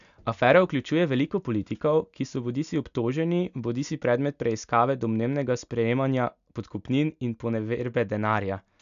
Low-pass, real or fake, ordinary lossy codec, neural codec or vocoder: 7.2 kHz; real; none; none